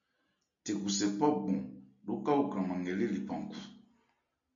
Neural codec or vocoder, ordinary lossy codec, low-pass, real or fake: none; MP3, 48 kbps; 7.2 kHz; real